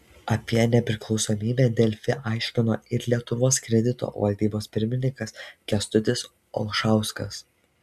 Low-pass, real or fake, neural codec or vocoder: 14.4 kHz; real; none